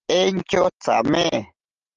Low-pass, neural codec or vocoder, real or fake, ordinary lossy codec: 7.2 kHz; none; real; Opus, 16 kbps